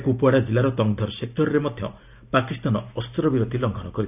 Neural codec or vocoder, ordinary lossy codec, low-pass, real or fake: none; none; 3.6 kHz; real